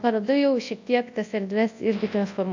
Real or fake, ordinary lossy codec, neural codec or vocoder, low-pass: fake; AAC, 48 kbps; codec, 24 kHz, 0.9 kbps, WavTokenizer, large speech release; 7.2 kHz